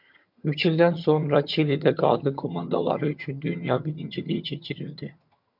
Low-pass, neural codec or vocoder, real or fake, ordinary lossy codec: 5.4 kHz; vocoder, 22.05 kHz, 80 mel bands, HiFi-GAN; fake; MP3, 48 kbps